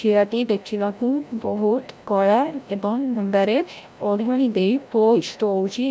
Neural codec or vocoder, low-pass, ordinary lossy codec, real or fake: codec, 16 kHz, 0.5 kbps, FreqCodec, larger model; none; none; fake